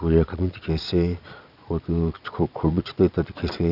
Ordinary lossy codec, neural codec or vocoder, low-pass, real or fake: none; vocoder, 22.05 kHz, 80 mel bands, Vocos; 5.4 kHz; fake